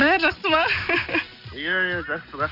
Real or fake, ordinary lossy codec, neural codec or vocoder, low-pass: real; none; none; 5.4 kHz